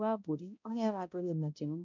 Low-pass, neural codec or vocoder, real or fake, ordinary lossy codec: 7.2 kHz; codec, 16 kHz, 0.5 kbps, X-Codec, HuBERT features, trained on balanced general audio; fake; none